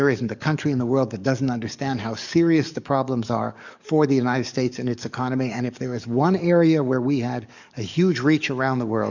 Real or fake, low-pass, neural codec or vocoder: fake; 7.2 kHz; codec, 44.1 kHz, 7.8 kbps, DAC